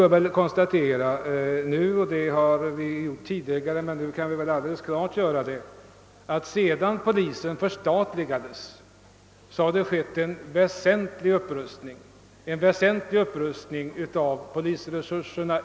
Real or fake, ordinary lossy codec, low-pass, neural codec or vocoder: real; none; none; none